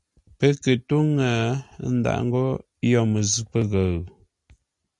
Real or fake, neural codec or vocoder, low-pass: real; none; 10.8 kHz